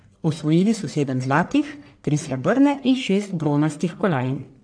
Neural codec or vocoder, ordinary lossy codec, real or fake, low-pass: codec, 44.1 kHz, 1.7 kbps, Pupu-Codec; AAC, 64 kbps; fake; 9.9 kHz